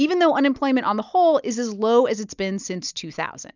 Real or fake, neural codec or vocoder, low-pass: real; none; 7.2 kHz